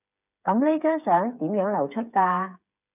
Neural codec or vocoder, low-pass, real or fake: codec, 16 kHz, 8 kbps, FreqCodec, smaller model; 3.6 kHz; fake